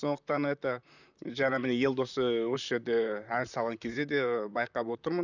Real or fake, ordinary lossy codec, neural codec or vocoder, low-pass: fake; none; vocoder, 44.1 kHz, 128 mel bands, Pupu-Vocoder; 7.2 kHz